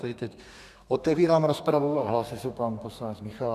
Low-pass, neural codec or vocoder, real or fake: 14.4 kHz; codec, 32 kHz, 1.9 kbps, SNAC; fake